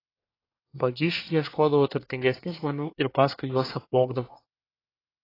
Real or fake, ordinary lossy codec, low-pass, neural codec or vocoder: fake; AAC, 24 kbps; 5.4 kHz; codec, 24 kHz, 1 kbps, SNAC